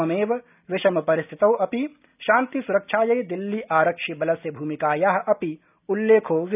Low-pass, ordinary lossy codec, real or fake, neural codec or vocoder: 3.6 kHz; none; real; none